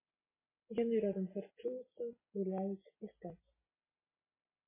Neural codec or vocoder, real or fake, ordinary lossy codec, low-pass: none; real; MP3, 16 kbps; 3.6 kHz